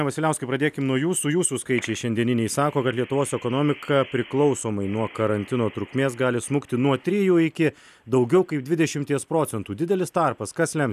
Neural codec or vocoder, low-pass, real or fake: none; 14.4 kHz; real